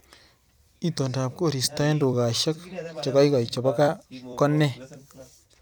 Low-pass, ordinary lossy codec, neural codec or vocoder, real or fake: none; none; vocoder, 44.1 kHz, 128 mel bands every 256 samples, BigVGAN v2; fake